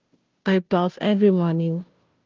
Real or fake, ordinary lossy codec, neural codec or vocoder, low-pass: fake; Opus, 24 kbps; codec, 16 kHz, 0.5 kbps, FunCodec, trained on Chinese and English, 25 frames a second; 7.2 kHz